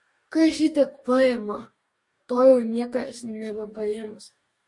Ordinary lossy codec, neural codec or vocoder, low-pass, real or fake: MP3, 48 kbps; codec, 44.1 kHz, 2.6 kbps, DAC; 10.8 kHz; fake